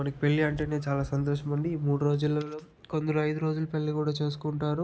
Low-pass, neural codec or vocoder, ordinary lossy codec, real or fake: none; none; none; real